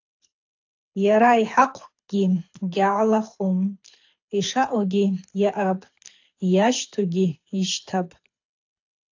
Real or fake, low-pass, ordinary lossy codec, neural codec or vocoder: fake; 7.2 kHz; AAC, 48 kbps; codec, 24 kHz, 6 kbps, HILCodec